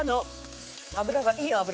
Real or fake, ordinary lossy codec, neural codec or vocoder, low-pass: fake; none; codec, 16 kHz, 4 kbps, X-Codec, HuBERT features, trained on general audio; none